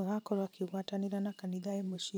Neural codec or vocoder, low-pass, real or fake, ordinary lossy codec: vocoder, 44.1 kHz, 128 mel bands every 512 samples, BigVGAN v2; none; fake; none